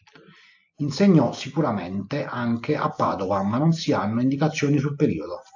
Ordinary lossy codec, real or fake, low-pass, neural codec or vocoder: AAC, 48 kbps; real; 7.2 kHz; none